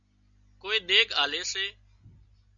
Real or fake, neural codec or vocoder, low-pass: real; none; 7.2 kHz